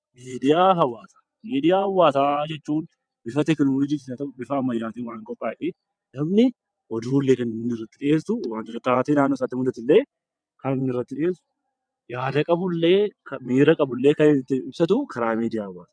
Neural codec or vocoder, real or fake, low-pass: vocoder, 22.05 kHz, 80 mel bands, WaveNeXt; fake; 9.9 kHz